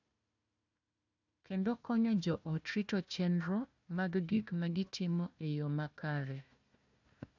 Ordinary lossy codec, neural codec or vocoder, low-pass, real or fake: none; codec, 16 kHz, 1 kbps, FunCodec, trained on Chinese and English, 50 frames a second; 7.2 kHz; fake